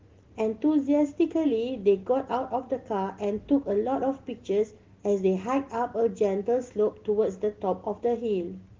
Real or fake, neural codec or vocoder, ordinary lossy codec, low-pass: real; none; Opus, 16 kbps; 7.2 kHz